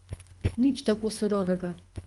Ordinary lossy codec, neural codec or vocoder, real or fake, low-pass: Opus, 32 kbps; codec, 24 kHz, 3 kbps, HILCodec; fake; 10.8 kHz